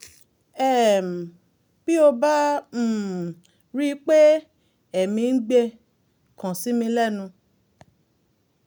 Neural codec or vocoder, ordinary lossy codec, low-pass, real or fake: none; none; none; real